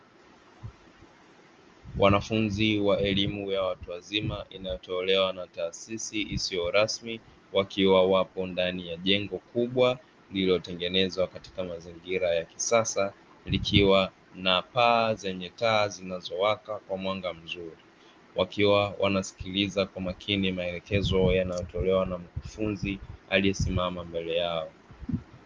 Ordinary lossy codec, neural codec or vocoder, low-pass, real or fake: Opus, 32 kbps; none; 7.2 kHz; real